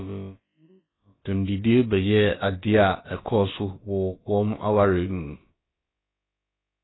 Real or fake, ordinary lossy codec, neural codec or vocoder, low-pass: fake; AAC, 16 kbps; codec, 16 kHz, about 1 kbps, DyCAST, with the encoder's durations; 7.2 kHz